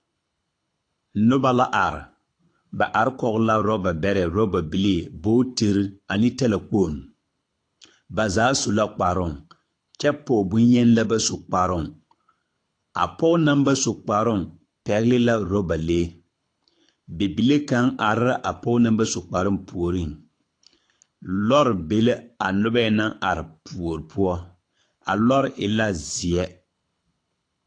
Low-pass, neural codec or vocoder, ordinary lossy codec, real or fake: 9.9 kHz; codec, 24 kHz, 6 kbps, HILCodec; AAC, 48 kbps; fake